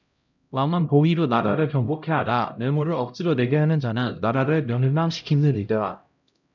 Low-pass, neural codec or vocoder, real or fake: 7.2 kHz; codec, 16 kHz, 0.5 kbps, X-Codec, HuBERT features, trained on LibriSpeech; fake